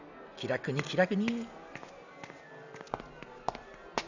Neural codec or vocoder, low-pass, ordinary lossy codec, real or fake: none; 7.2 kHz; none; real